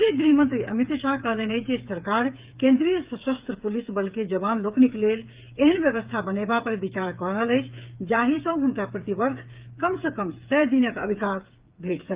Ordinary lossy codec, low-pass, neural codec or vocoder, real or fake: Opus, 32 kbps; 3.6 kHz; codec, 16 kHz, 8 kbps, FreqCodec, smaller model; fake